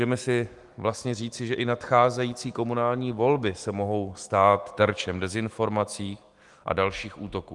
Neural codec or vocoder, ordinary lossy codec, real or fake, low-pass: autoencoder, 48 kHz, 128 numbers a frame, DAC-VAE, trained on Japanese speech; Opus, 24 kbps; fake; 10.8 kHz